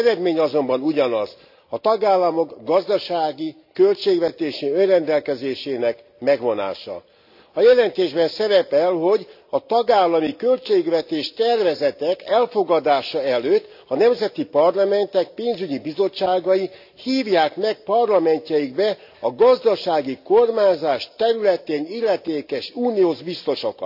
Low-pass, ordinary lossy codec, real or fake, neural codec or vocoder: 5.4 kHz; AAC, 48 kbps; real; none